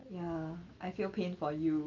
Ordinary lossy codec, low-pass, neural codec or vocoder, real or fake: Opus, 24 kbps; 7.2 kHz; none; real